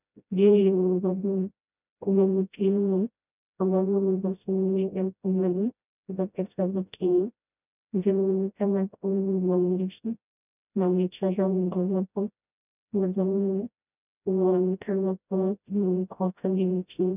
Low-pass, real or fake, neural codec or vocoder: 3.6 kHz; fake; codec, 16 kHz, 0.5 kbps, FreqCodec, smaller model